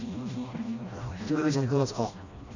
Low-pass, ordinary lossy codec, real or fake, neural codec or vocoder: 7.2 kHz; none; fake; codec, 16 kHz, 1 kbps, FreqCodec, smaller model